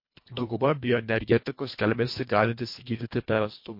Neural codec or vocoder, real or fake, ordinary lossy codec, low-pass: codec, 24 kHz, 1.5 kbps, HILCodec; fake; MP3, 32 kbps; 5.4 kHz